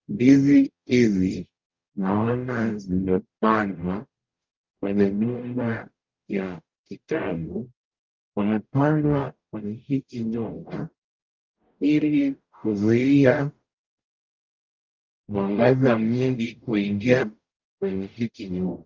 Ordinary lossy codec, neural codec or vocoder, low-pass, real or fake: Opus, 32 kbps; codec, 44.1 kHz, 0.9 kbps, DAC; 7.2 kHz; fake